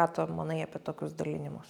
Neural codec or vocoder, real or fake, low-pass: none; real; 19.8 kHz